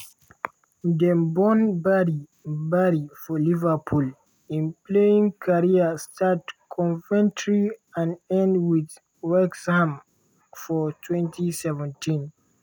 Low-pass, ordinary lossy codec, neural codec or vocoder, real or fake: 19.8 kHz; none; none; real